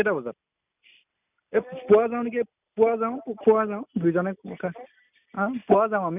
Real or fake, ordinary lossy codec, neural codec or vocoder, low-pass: real; none; none; 3.6 kHz